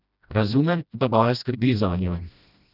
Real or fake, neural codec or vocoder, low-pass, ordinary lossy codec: fake; codec, 16 kHz, 1 kbps, FreqCodec, smaller model; 5.4 kHz; none